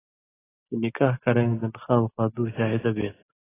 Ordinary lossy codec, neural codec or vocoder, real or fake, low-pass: AAC, 16 kbps; none; real; 3.6 kHz